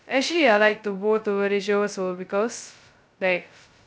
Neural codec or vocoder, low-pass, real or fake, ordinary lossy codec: codec, 16 kHz, 0.2 kbps, FocalCodec; none; fake; none